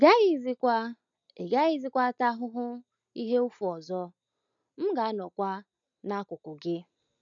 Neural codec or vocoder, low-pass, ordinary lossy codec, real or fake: none; 7.2 kHz; none; real